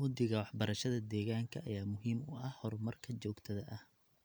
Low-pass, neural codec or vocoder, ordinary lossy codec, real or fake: none; none; none; real